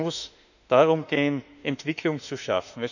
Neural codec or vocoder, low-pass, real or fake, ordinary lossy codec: autoencoder, 48 kHz, 32 numbers a frame, DAC-VAE, trained on Japanese speech; 7.2 kHz; fake; none